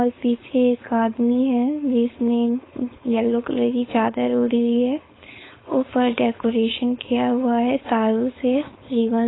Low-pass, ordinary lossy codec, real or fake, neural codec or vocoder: 7.2 kHz; AAC, 16 kbps; fake; codec, 16 kHz, 4.8 kbps, FACodec